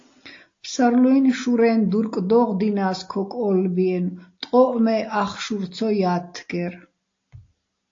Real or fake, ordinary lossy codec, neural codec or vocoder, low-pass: real; AAC, 48 kbps; none; 7.2 kHz